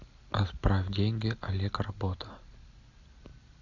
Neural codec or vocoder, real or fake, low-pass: none; real; 7.2 kHz